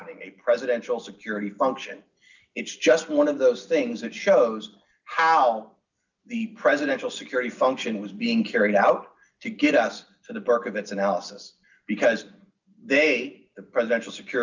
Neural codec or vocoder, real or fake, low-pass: none; real; 7.2 kHz